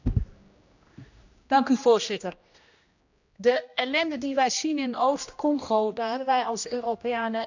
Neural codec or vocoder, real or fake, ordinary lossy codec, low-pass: codec, 16 kHz, 1 kbps, X-Codec, HuBERT features, trained on general audio; fake; none; 7.2 kHz